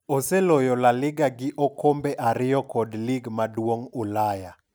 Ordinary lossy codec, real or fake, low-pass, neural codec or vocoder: none; real; none; none